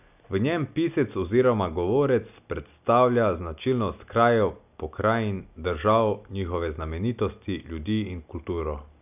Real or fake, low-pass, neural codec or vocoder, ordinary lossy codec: real; 3.6 kHz; none; none